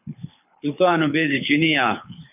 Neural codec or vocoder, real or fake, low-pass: codec, 24 kHz, 6 kbps, HILCodec; fake; 3.6 kHz